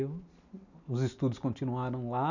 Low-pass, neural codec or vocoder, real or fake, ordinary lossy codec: 7.2 kHz; none; real; none